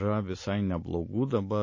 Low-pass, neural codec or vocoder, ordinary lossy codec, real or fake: 7.2 kHz; none; MP3, 32 kbps; real